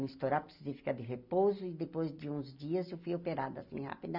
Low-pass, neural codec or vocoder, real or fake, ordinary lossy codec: 5.4 kHz; none; real; none